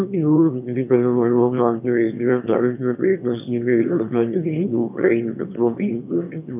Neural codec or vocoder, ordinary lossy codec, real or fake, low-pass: autoencoder, 22.05 kHz, a latent of 192 numbers a frame, VITS, trained on one speaker; none; fake; 3.6 kHz